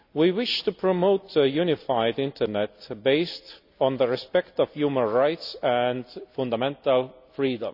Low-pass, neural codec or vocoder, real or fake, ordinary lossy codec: 5.4 kHz; none; real; none